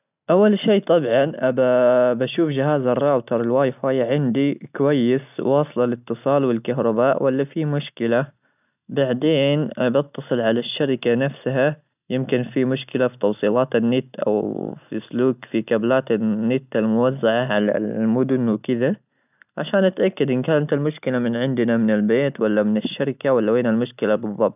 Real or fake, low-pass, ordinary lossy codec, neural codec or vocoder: real; 3.6 kHz; none; none